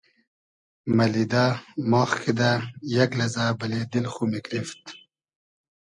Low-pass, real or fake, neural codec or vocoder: 10.8 kHz; real; none